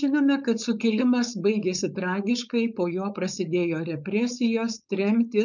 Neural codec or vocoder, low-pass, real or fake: codec, 16 kHz, 4.8 kbps, FACodec; 7.2 kHz; fake